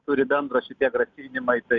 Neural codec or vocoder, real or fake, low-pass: none; real; 7.2 kHz